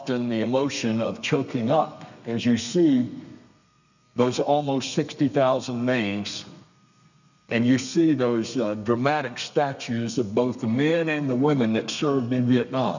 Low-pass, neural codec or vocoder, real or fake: 7.2 kHz; codec, 32 kHz, 1.9 kbps, SNAC; fake